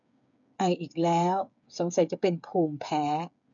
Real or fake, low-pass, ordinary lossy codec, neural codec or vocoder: fake; 7.2 kHz; MP3, 96 kbps; codec, 16 kHz, 8 kbps, FreqCodec, smaller model